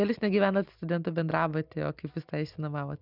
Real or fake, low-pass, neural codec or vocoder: real; 5.4 kHz; none